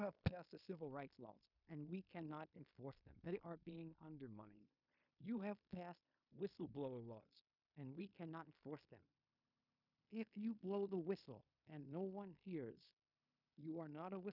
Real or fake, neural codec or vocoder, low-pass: fake; codec, 16 kHz in and 24 kHz out, 0.9 kbps, LongCat-Audio-Codec, fine tuned four codebook decoder; 5.4 kHz